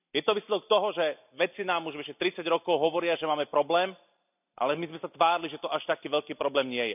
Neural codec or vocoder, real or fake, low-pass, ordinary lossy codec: none; real; 3.6 kHz; none